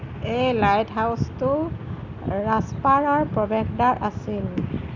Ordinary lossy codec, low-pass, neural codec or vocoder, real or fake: none; 7.2 kHz; none; real